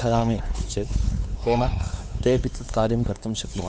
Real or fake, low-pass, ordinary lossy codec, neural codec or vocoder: fake; none; none; codec, 16 kHz, 4 kbps, X-Codec, WavLM features, trained on Multilingual LibriSpeech